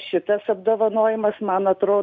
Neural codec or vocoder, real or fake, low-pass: none; real; 7.2 kHz